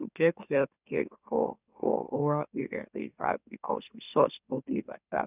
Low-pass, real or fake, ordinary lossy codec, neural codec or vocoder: 3.6 kHz; fake; none; autoencoder, 44.1 kHz, a latent of 192 numbers a frame, MeloTTS